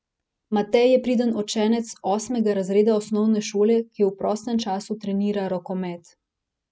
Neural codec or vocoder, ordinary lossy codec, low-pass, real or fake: none; none; none; real